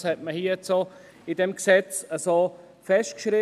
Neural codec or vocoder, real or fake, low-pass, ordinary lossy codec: none; real; 14.4 kHz; none